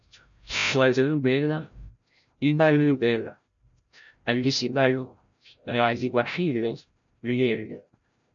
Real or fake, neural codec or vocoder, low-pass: fake; codec, 16 kHz, 0.5 kbps, FreqCodec, larger model; 7.2 kHz